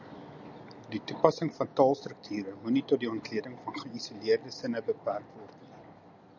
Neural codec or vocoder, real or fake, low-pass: none; real; 7.2 kHz